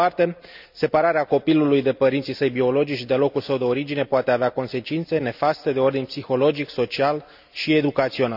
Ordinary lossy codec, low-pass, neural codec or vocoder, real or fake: none; 5.4 kHz; none; real